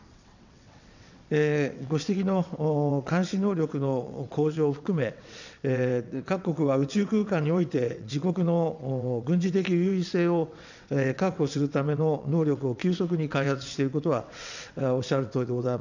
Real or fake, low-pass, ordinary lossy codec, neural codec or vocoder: fake; 7.2 kHz; none; vocoder, 22.05 kHz, 80 mel bands, WaveNeXt